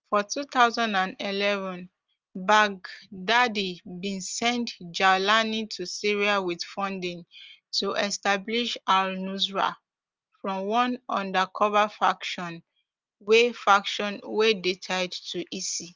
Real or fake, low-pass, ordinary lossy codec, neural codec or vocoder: real; 7.2 kHz; Opus, 32 kbps; none